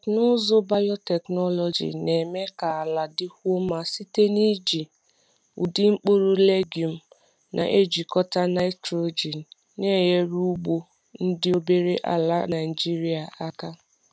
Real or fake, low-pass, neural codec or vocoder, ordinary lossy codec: real; none; none; none